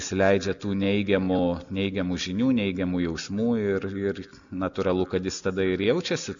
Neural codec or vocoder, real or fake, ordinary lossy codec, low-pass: none; real; MP3, 96 kbps; 7.2 kHz